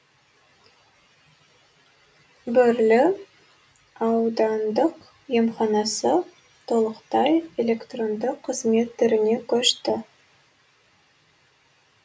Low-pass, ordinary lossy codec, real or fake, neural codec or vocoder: none; none; real; none